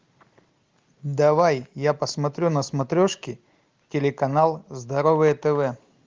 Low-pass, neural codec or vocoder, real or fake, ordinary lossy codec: 7.2 kHz; none; real; Opus, 32 kbps